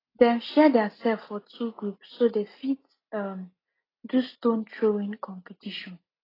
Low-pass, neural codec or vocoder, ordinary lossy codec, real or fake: 5.4 kHz; none; AAC, 24 kbps; real